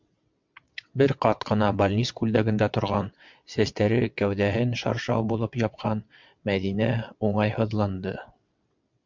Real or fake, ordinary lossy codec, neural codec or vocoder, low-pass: fake; MP3, 64 kbps; vocoder, 22.05 kHz, 80 mel bands, WaveNeXt; 7.2 kHz